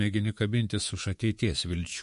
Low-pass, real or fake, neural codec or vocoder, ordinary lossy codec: 10.8 kHz; fake; codec, 24 kHz, 3.1 kbps, DualCodec; MP3, 48 kbps